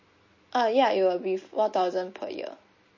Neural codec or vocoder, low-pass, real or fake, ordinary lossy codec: none; 7.2 kHz; real; MP3, 32 kbps